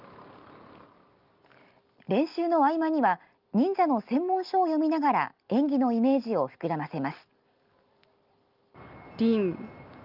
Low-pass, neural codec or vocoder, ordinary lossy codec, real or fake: 5.4 kHz; none; Opus, 32 kbps; real